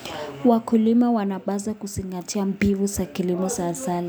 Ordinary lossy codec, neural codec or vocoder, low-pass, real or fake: none; none; none; real